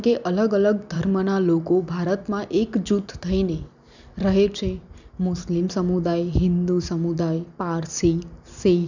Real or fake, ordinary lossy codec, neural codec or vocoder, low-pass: real; none; none; 7.2 kHz